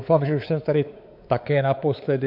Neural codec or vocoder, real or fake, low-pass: codec, 16 kHz, 4 kbps, X-Codec, WavLM features, trained on Multilingual LibriSpeech; fake; 5.4 kHz